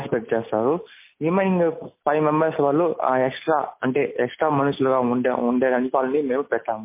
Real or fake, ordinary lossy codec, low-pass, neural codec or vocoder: real; MP3, 24 kbps; 3.6 kHz; none